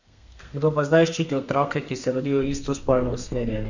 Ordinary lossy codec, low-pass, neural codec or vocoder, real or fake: none; 7.2 kHz; codec, 32 kHz, 1.9 kbps, SNAC; fake